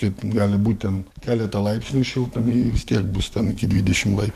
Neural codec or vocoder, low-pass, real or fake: codec, 44.1 kHz, 7.8 kbps, DAC; 14.4 kHz; fake